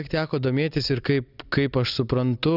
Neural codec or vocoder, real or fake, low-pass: none; real; 5.4 kHz